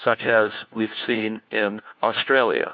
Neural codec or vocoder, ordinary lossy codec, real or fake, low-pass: codec, 16 kHz, 1 kbps, FunCodec, trained on LibriTTS, 50 frames a second; MP3, 64 kbps; fake; 7.2 kHz